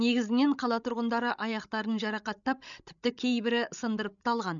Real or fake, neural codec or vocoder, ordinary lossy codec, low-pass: fake; codec, 16 kHz, 16 kbps, FreqCodec, larger model; none; 7.2 kHz